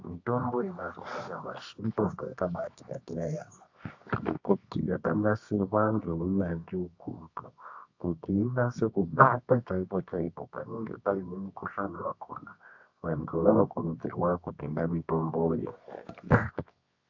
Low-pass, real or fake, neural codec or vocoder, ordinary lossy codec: 7.2 kHz; fake; codec, 24 kHz, 0.9 kbps, WavTokenizer, medium music audio release; AAC, 48 kbps